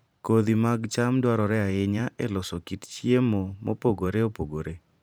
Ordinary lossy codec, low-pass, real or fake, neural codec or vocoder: none; none; fake; vocoder, 44.1 kHz, 128 mel bands every 512 samples, BigVGAN v2